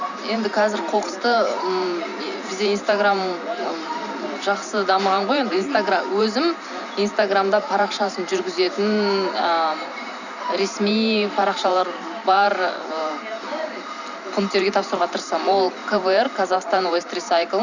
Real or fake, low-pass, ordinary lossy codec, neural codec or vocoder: fake; 7.2 kHz; none; vocoder, 44.1 kHz, 128 mel bands every 512 samples, BigVGAN v2